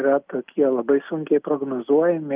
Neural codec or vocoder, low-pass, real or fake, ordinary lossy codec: none; 3.6 kHz; real; Opus, 32 kbps